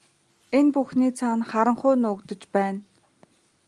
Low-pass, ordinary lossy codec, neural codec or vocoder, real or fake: 10.8 kHz; Opus, 32 kbps; none; real